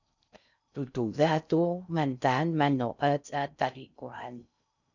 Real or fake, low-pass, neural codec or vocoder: fake; 7.2 kHz; codec, 16 kHz in and 24 kHz out, 0.6 kbps, FocalCodec, streaming, 4096 codes